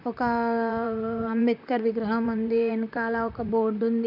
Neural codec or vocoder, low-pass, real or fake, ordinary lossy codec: vocoder, 44.1 kHz, 128 mel bands, Pupu-Vocoder; 5.4 kHz; fake; none